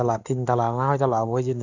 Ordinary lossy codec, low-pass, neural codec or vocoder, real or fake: none; 7.2 kHz; none; real